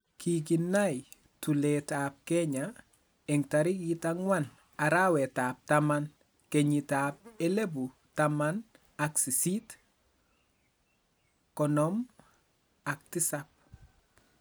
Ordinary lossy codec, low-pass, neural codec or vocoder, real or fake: none; none; none; real